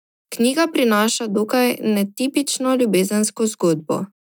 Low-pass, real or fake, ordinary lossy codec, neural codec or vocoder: 19.8 kHz; real; none; none